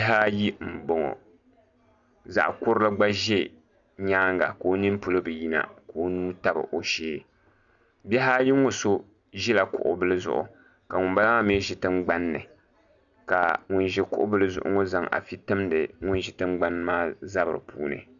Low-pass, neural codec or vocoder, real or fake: 7.2 kHz; none; real